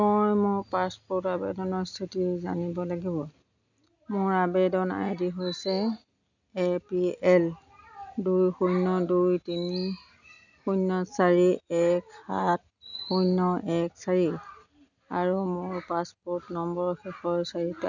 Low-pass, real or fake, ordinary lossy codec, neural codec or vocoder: 7.2 kHz; real; none; none